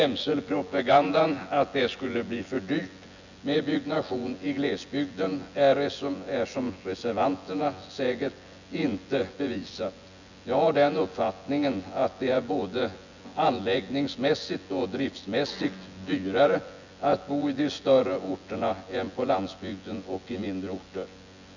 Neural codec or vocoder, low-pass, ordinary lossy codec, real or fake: vocoder, 24 kHz, 100 mel bands, Vocos; 7.2 kHz; none; fake